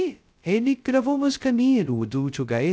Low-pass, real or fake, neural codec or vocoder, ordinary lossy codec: none; fake; codec, 16 kHz, 0.2 kbps, FocalCodec; none